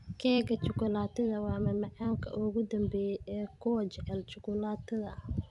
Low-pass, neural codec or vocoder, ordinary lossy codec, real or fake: 10.8 kHz; vocoder, 44.1 kHz, 128 mel bands every 256 samples, BigVGAN v2; MP3, 96 kbps; fake